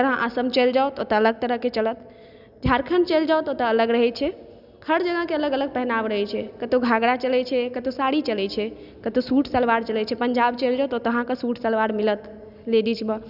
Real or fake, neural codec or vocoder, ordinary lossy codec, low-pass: real; none; none; 5.4 kHz